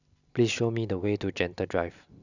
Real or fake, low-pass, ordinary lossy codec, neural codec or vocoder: real; 7.2 kHz; none; none